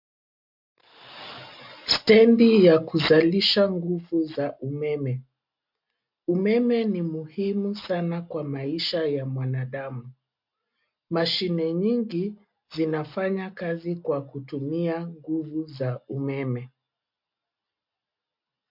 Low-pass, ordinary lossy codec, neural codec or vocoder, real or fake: 5.4 kHz; MP3, 48 kbps; none; real